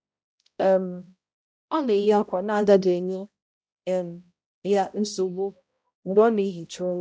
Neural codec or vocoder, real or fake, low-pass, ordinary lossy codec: codec, 16 kHz, 0.5 kbps, X-Codec, HuBERT features, trained on balanced general audio; fake; none; none